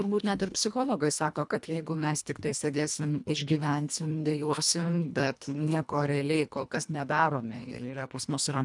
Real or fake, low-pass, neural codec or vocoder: fake; 10.8 kHz; codec, 24 kHz, 1.5 kbps, HILCodec